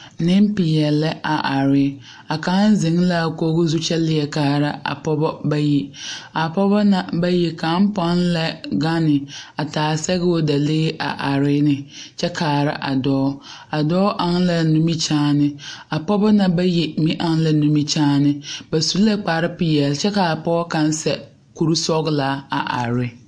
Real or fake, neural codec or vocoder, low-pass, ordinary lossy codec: real; none; 9.9 kHz; MP3, 48 kbps